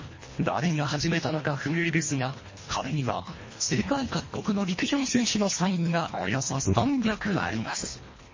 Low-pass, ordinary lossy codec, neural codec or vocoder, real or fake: 7.2 kHz; MP3, 32 kbps; codec, 24 kHz, 1.5 kbps, HILCodec; fake